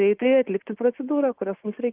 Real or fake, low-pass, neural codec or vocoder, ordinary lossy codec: real; 3.6 kHz; none; Opus, 32 kbps